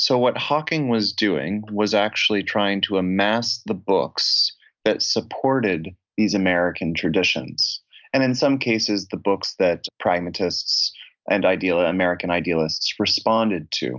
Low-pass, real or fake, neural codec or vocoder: 7.2 kHz; real; none